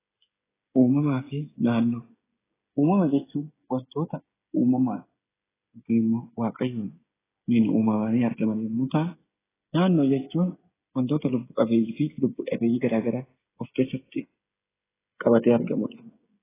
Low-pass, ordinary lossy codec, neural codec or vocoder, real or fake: 3.6 kHz; AAC, 16 kbps; codec, 16 kHz, 16 kbps, FreqCodec, smaller model; fake